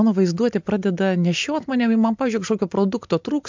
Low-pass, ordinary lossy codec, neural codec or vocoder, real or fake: 7.2 kHz; MP3, 64 kbps; none; real